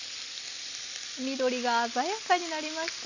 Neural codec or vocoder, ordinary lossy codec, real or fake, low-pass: none; none; real; 7.2 kHz